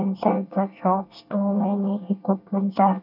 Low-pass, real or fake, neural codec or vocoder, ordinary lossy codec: 5.4 kHz; fake; codec, 24 kHz, 1 kbps, SNAC; none